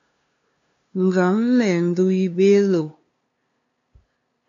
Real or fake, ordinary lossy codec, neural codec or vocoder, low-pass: fake; AAC, 48 kbps; codec, 16 kHz, 2 kbps, FunCodec, trained on LibriTTS, 25 frames a second; 7.2 kHz